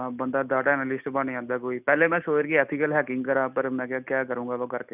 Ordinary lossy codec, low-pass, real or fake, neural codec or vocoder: none; 3.6 kHz; real; none